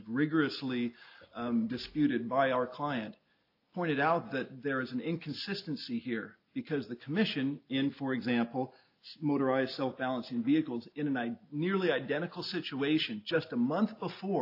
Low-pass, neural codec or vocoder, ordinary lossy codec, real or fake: 5.4 kHz; none; AAC, 32 kbps; real